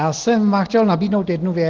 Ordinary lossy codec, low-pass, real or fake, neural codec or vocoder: Opus, 16 kbps; 7.2 kHz; real; none